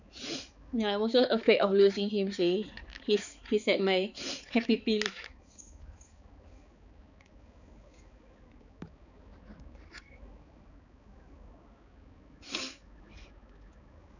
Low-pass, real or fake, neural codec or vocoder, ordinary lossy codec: 7.2 kHz; fake; codec, 16 kHz, 4 kbps, X-Codec, HuBERT features, trained on balanced general audio; none